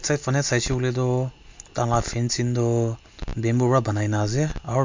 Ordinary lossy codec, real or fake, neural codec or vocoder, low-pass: MP3, 48 kbps; real; none; 7.2 kHz